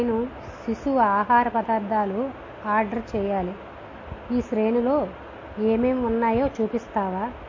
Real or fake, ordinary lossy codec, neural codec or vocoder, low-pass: real; MP3, 32 kbps; none; 7.2 kHz